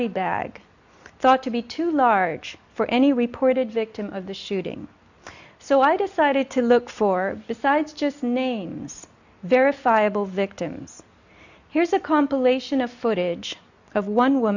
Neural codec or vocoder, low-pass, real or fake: none; 7.2 kHz; real